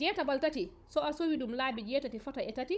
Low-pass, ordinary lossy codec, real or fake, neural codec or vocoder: none; none; fake; codec, 16 kHz, 16 kbps, FunCodec, trained on Chinese and English, 50 frames a second